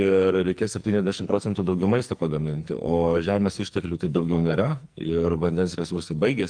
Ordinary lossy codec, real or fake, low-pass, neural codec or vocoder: Opus, 32 kbps; fake; 9.9 kHz; codec, 44.1 kHz, 2.6 kbps, SNAC